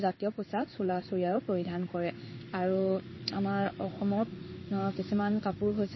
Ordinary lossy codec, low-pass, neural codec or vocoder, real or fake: MP3, 24 kbps; 7.2 kHz; codec, 16 kHz in and 24 kHz out, 1 kbps, XY-Tokenizer; fake